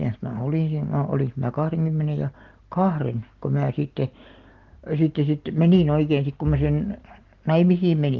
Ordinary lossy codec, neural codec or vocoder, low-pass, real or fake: Opus, 16 kbps; none; 7.2 kHz; real